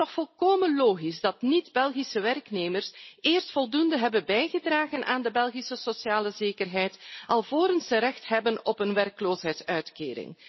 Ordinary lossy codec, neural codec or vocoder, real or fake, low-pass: MP3, 24 kbps; none; real; 7.2 kHz